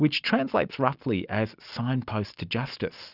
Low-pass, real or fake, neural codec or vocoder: 5.4 kHz; real; none